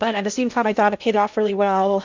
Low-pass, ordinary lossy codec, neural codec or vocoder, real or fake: 7.2 kHz; MP3, 64 kbps; codec, 16 kHz in and 24 kHz out, 0.6 kbps, FocalCodec, streaming, 2048 codes; fake